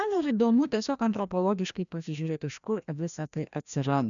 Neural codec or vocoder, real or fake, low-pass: codec, 16 kHz, 1 kbps, FreqCodec, larger model; fake; 7.2 kHz